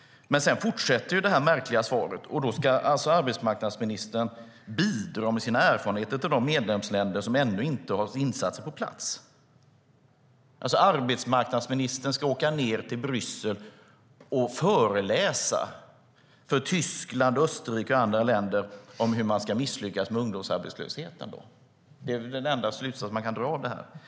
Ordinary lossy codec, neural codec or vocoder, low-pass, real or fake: none; none; none; real